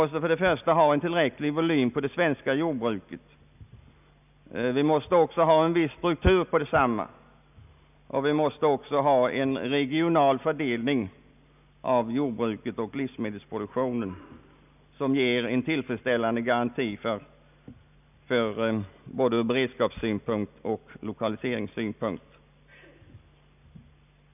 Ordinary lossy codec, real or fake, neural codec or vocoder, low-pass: none; real; none; 3.6 kHz